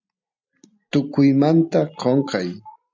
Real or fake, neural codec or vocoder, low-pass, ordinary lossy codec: real; none; 7.2 kHz; MP3, 64 kbps